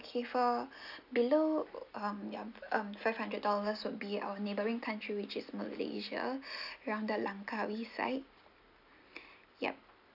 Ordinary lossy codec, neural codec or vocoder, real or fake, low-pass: none; none; real; 5.4 kHz